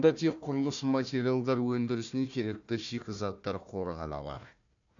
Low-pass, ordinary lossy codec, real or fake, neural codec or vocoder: 7.2 kHz; none; fake; codec, 16 kHz, 1 kbps, FunCodec, trained on Chinese and English, 50 frames a second